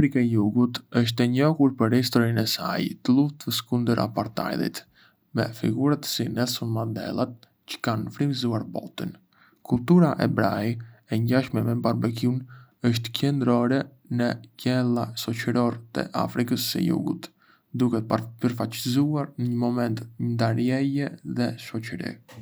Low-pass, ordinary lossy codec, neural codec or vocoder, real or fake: none; none; none; real